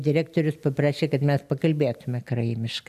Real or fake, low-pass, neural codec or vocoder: real; 14.4 kHz; none